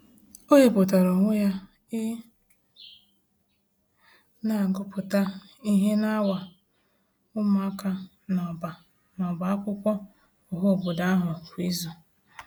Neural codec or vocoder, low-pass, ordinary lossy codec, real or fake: none; none; none; real